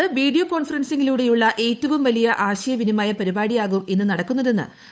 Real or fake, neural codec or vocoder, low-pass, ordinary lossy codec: fake; codec, 16 kHz, 8 kbps, FunCodec, trained on Chinese and English, 25 frames a second; none; none